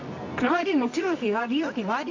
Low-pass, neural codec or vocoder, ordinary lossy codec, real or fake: 7.2 kHz; codec, 24 kHz, 0.9 kbps, WavTokenizer, medium music audio release; AAC, 48 kbps; fake